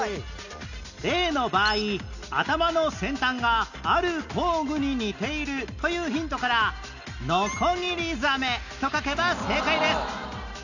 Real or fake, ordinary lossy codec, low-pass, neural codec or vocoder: real; none; 7.2 kHz; none